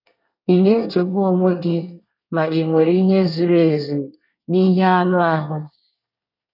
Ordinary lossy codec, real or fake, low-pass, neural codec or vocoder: none; fake; 5.4 kHz; codec, 24 kHz, 1 kbps, SNAC